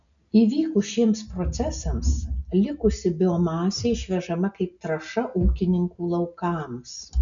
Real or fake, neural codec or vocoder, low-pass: real; none; 7.2 kHz